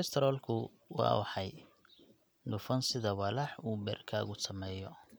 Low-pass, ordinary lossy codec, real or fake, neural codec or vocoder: none; none; real; none